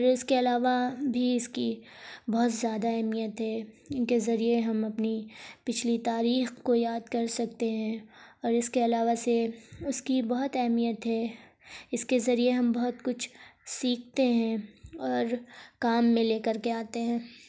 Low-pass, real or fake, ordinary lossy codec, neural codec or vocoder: none; real; none; none